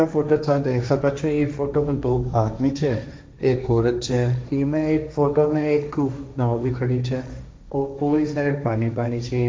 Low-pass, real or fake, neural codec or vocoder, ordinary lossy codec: none; fake; codec, 16 kHz, 1.1 kbps, Voila-Tokenizer; none